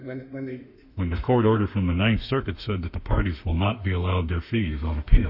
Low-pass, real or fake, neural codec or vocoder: 5.4 kHz; fake; autoencoder, 48 kHz, 32 numbers a frame, DAC-VAE, trained on Japanese speech